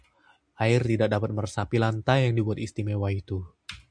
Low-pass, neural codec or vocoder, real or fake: 9.9 kHz; none; real